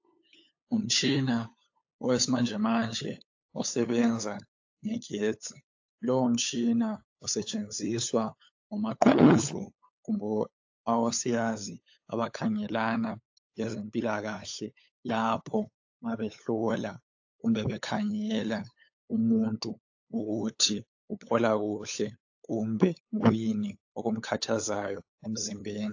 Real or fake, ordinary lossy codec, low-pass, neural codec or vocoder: fake; AAC, 48 kbps; 7.2 kHz; codec, 16 kHz, 8 kbps, FunCodec, trained on LibriTTS, 25 frames a second